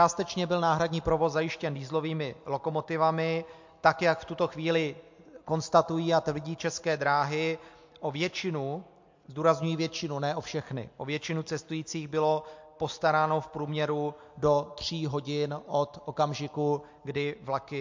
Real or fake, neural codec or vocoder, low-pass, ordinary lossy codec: real; none; 7.2 kHz; MP3, 48 kbps